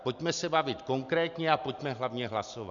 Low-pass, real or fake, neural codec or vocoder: 7.2 kHz; real; none